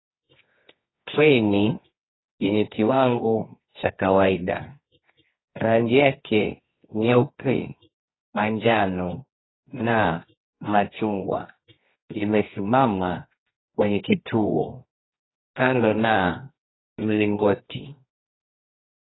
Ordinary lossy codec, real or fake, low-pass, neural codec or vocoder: AAC, 16 kbps; fake; 7.2 kHz; codec, 24 kHz, 0.9 kbps, WavTokenizer, medium music audio release